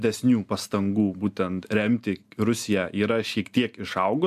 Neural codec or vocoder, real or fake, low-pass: none; real; 14.4 kHz